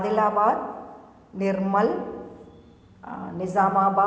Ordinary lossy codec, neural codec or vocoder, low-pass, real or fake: none; none; none; real